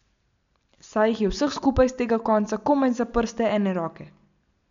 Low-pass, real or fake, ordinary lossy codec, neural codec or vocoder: 7.2 kHz; real; MP3, 64 kbps; none